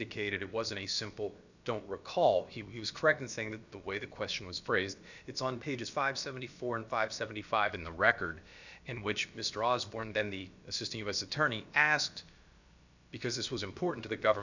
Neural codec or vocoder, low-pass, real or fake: codec, 16 kHz, about 1 kbps, DyCAST, with the encoder's durations; 7.2 kHz; fake